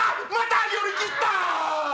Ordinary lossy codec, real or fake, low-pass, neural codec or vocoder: none; real; none; none